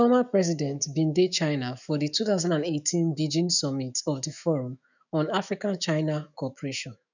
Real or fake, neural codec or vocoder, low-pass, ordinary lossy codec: fake; autoencoder, 48 kHz, 128 numbers a frame, DAC-VAE, trained on Japanese speech; 7.2 kHz; none